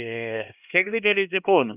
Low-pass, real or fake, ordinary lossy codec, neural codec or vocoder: 3.6 kHz; fake; none; codec, 16 kHz, 1 kbps, X-Codec, HuBERT features, trained on LibriSpeech